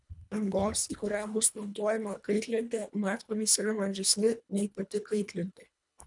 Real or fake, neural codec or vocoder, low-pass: fake; codec, 24 kHz, 1.5 kbps, HILCodec; 10.8 kHz